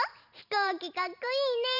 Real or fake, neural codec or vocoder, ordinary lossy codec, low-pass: fake; vocoder, 44.1 kHz, 128 mel bands every 512 samples, BigVGAN v2; none; 5.4 kHz